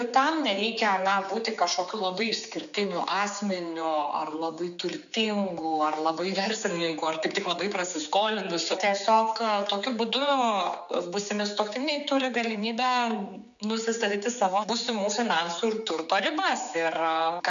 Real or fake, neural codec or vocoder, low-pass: fake; codec, 16 kHz, 4 kbps, X-Codec, HuBERT features, trained on general audio; 7.2 kHz